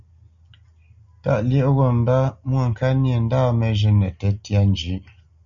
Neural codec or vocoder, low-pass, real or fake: none; 7.2 kHz; real